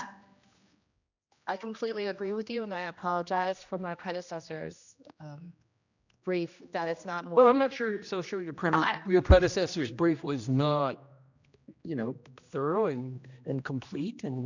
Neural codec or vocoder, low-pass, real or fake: codec, 16 kHz, 1 kbps, X-Codec, HuBERT features, trained on general audio; 7.2 kHz; fake